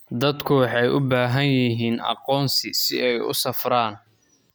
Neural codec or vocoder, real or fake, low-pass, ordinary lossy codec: none; real; none; none